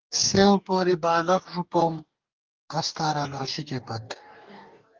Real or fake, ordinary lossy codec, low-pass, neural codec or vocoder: fake; Opus, 32 kbps; 7.2 kHz; codec, 44.1 kHz, 2.6 kbps, DAC